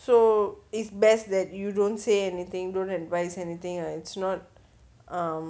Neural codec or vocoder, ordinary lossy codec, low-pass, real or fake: none; none; none; real